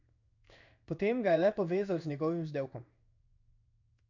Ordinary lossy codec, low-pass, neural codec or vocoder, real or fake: none; 7.2 kHz; codec, 16 kHz in and 24 kHz out, 1 kbps, XY-Tokenizer; fake